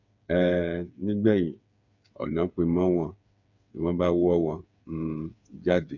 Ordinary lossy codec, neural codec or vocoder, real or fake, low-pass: none; codec, 16 kHz, 8 kbps, FreqCodec, smaller model; fake; 7.2 kHz